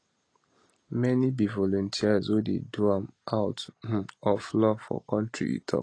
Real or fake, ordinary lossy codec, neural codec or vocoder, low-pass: real; AAC, 32 kbps; none; 9.9 kHz